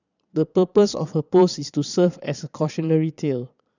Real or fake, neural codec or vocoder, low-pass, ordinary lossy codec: fake; vocoder, 22.05 kHz, 80 mel bands, Vocos; 7.2 kHz; none